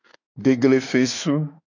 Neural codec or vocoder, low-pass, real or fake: autoencoder, 48 kHz, 32 numbers a frame, DAC-VAE, trained on Japanese speech; 7.2 kHz; fake